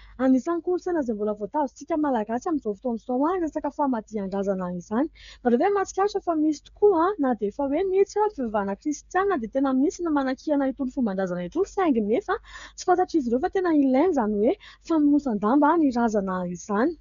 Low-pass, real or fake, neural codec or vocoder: 7.2 kHz; fake; codec, 16 kHz, 8 kbps, FreqCodec, smaller model